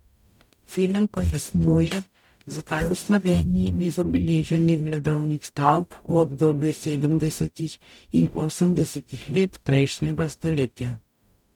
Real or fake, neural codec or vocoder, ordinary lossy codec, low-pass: fake; codec, 44.1 kHz, 0.9 kbps, DAC; none; 19.8 kHz